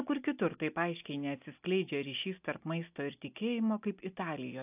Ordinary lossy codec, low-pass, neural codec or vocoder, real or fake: AAC, 32 kbps; 3.6 kHz; none; real